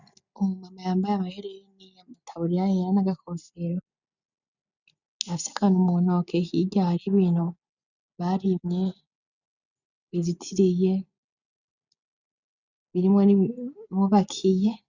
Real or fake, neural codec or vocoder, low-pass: real; none; 7.2 kHz